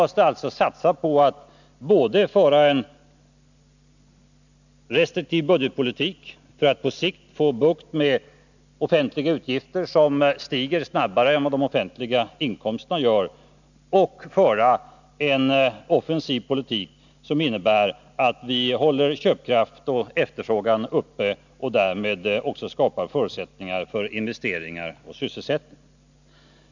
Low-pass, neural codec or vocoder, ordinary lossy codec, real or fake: 7.2 kHz; none; none; real